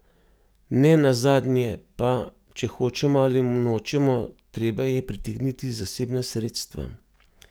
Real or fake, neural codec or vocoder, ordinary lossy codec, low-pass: fake; codec, 44.1 kHz, 7.8 kbps, DAC; none; none